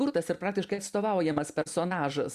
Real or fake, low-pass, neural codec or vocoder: real; 14.4 kHz; none